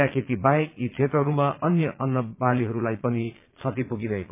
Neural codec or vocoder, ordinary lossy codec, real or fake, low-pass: vocoder, 22.05 kHz, 80 mel bands, Vocos; MP3, 24 kbps; fake; 3.6 kHz